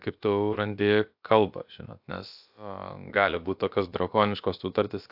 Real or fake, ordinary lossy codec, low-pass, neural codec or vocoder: fake; AAC, 48 kbps; 5.4 kHz; codec, 16 kHz, about 1 kbps, DyCAST, with the encoder's durations